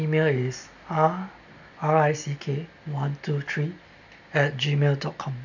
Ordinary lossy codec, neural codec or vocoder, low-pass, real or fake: none; none; 7.2 kHz; real